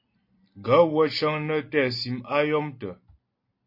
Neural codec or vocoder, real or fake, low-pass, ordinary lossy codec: none; real; 5.4 kHz; MP3, 32 kbps